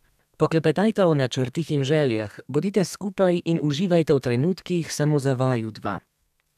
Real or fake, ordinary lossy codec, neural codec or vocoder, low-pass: fake; none; codec, 32 kHz, 1.9 kbps, SNAC; 14.4 kHz